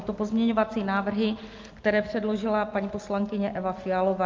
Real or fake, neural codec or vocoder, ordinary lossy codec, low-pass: real; none; Opus, 32 kbps; 7.2 kHz